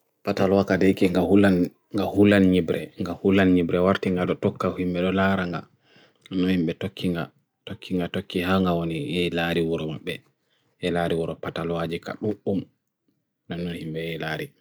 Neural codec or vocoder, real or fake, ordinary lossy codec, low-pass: none; real; none; none